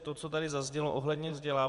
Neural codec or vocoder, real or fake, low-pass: vocoder, 24 kHz, 100 mel bands, Vocos; fake; 10.8 kHz